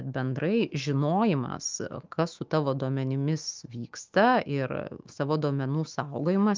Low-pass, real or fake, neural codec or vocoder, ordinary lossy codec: 7.2 kHz; fake; autoencoder, 48 kHz, 128 numbers a frame, DAC-VAE, trained on Japanese speech; Opus, 24 kbps